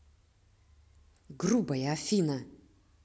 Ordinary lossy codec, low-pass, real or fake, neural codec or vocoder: none; none; real; none